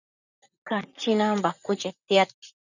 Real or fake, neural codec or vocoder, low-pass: fake; vocoder, 44.1 kHz, 128 mel bands, Pupu-Vocoder; 7.2 kHz